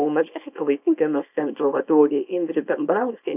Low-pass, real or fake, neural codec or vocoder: 3.6 kHz; fake; codec, 24 kHz, 0.9 kbps, WavTokenizer, small release